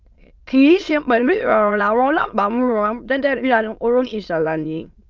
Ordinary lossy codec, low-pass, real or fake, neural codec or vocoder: Opus, 32 kbps; 7.2 kHz; fake; autoencoder, 22.05 kHz, a latent of 192 numbers a frame, VITS, trained on many speakers